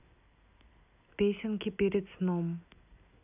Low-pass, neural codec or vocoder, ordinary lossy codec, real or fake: 3.6 kHz; none; none; real